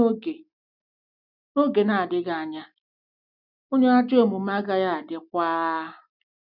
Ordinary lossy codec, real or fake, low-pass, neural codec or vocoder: none; real; 5.4 kHz; none